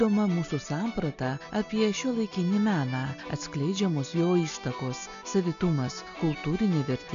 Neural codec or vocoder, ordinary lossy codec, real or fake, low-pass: none; MP3, 96 kbps; real; 7.2 kHz